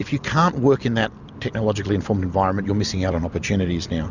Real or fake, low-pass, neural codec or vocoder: real; 7.2 kHz; none